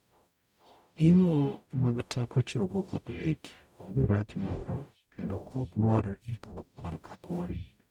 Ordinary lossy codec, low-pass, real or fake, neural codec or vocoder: none; 19.8 kHz; fake; codec, 44.1 kHz, 0.9 kbps, DAC